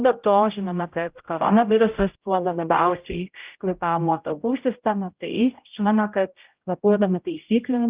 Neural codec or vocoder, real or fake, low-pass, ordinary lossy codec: codec, 16 kHz, 0.5 kbps, X-Codec, HuBERT features, trained on general audio; fake; 3.6 kHz; Opus, 32 kbps